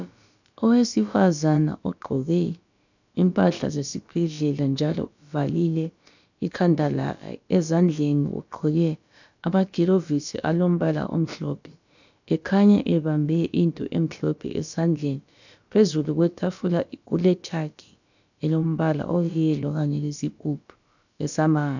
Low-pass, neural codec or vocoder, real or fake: 7.2 kHz; codec, 16 kHz, about 1 kbps, DyCAST, with the encoder's durations; fake